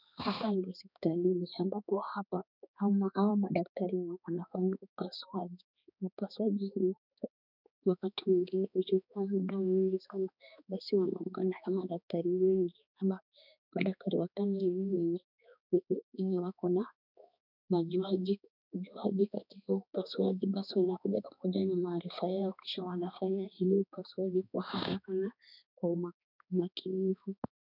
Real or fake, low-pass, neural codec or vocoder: fake; 5.4 kHz; codec, 16 kHz, 2 kbps, X-Codec, HuBERT features, trained on balanced general audio